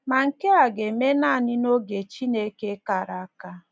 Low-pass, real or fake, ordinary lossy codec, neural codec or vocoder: none; real; none; none